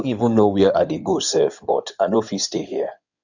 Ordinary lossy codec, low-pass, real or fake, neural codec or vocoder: none; 7.2 kHz; fake; codec, 16 kHz in and 24 kHz out, 2.2 kbps, FireRedTTS-2 codec